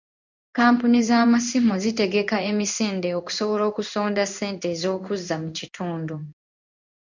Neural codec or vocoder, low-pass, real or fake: codec, 16 kHz in and 24 kHz out, 1 kbps, XY-Tokenizer; 7.2 kHz; fake